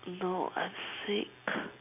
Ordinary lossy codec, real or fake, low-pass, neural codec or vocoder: none; real; 3.6 kHz; none